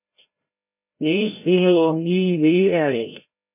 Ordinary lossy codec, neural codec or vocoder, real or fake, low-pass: MP3, 32 kbps; codec, 16 kHz, 0.5 kbps, FreqCodec, larger model; fake; 3.6 kHz